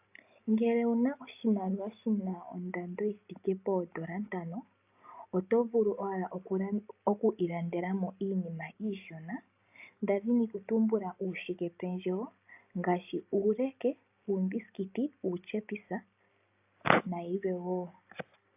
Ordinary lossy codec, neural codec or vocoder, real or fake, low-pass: AAC, 32 kbps; none; real; 3.6 kHz